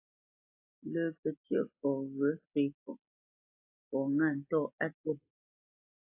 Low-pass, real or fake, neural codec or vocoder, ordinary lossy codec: 3.6 kHz; real; none; AAC, 32 kbps